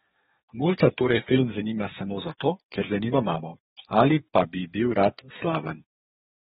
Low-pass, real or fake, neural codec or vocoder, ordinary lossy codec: 14.4 kHz; fake; codec, 32 kHz, 1.9 kbps, SNAC; AAC, 16 kbps